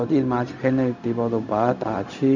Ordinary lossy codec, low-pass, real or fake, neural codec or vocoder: none; 7.2 kHz; fake; codec, 16 kHz, 0.4 kbps, LongCat-Audio-Codec